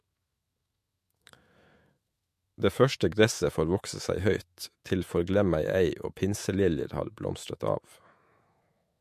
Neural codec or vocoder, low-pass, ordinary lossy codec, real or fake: autoencoder, 48 kHz, 128 numbers a frame, DAC-VAE, trained on Japanese speech; 14.4 kHz; MP3, 64 kbps; fake